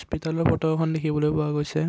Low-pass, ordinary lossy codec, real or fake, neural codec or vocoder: none; none; real; none